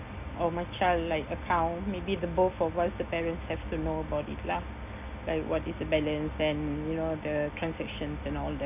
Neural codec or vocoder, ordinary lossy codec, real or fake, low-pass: none; none; real; 3.6 kHz